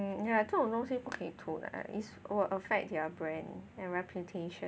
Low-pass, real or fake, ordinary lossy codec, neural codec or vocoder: none; real; none; none